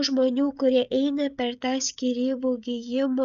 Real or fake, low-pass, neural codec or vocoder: fake; 7.2 kHz; codec, 16 kHz, 16 kbps, FreqCodec, smaller model